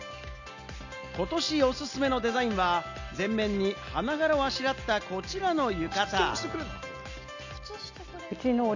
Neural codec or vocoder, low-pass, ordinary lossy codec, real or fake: none; 7.2 kHz; none; real